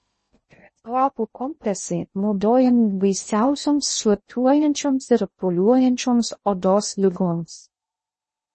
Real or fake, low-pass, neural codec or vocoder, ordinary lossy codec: fake; 10.8 kHz; codec, 16 kHz in and 24 kHz out, 0.6 kbps, FocalCodec, streaming, 2048 codes; MP3, 32 kbps